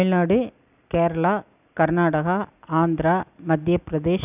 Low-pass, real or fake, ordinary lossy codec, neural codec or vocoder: 3.6 kHz; real; none; none